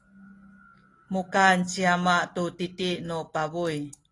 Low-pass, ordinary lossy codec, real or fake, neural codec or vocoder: 10.8 kHz; AAC, 32 kbps; real; none